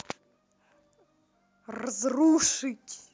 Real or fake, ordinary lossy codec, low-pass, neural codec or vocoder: real; none; none; none